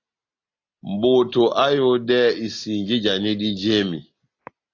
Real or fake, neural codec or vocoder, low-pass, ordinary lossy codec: real; none; 7.2 kHz; AAC, 48 kbps